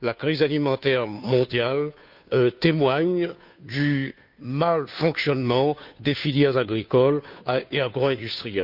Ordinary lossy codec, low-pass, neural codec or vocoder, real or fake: none; 5.4 kHz; codec, 16 kHz, 2 kbps, FunCodec, trained on Chinese and English, 25 frames a second; fake